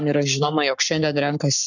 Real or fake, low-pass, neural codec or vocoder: fake; 7.2 kHz; codec, 44.1 kHz, 7.8 kbps, Pupu-Codec